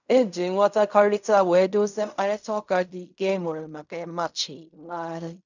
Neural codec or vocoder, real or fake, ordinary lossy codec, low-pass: codec, 16 kHz in and 24 kHz out, 0.4 kbps, LongCat-Audio-Codec, fine tuned four codebook decoder; fake; none; 7.2 kHz